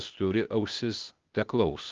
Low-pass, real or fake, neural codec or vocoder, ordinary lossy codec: 7.2 kHz; fake; codec, 16 kHz, 0.8 kbps, ZipCodec; Opus, 32 kbps